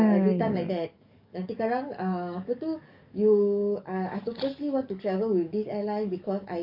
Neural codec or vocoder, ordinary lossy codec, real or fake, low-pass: codec, 44.1 kHz, 7.8 kbps, DAC; none; fake; 5.4 kHz